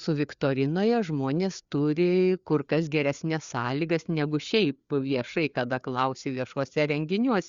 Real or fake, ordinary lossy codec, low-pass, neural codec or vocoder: fake; Opus, 64 kbps; 7.2 kHz; codec, 16 kHz, 4 kbps, FreqCodec, larger model